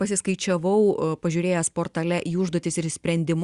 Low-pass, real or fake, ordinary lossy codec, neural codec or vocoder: 10.8 kHz; real; Opus, 64 kbps; none